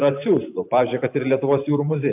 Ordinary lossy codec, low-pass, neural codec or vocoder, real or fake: AAC, 32 kbps; 3.6 kHz; vocoder, 44.1 kHz, 128 mel bands every 512 samples, BigVGAN v2; fake